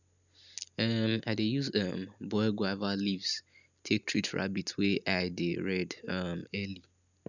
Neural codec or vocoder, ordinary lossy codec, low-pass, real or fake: none; none; 7.2 kHz; real